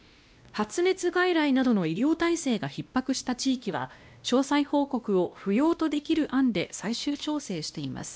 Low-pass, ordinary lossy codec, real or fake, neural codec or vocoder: none; none; fake; codec, 16 kHz, 1 kbps, X-Codec, WavLM features, trained on Multilingual LibriSpeech